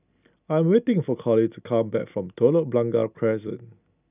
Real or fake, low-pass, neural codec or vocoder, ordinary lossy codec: real; 3.6 kHz; none; none